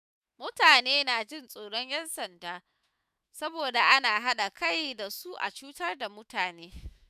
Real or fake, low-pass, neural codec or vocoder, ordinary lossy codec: fake; 14.4 kHz; autoencoder, 48 kHz, 128 numbers a frame, DAC-VAE, trained on Japanese speech; none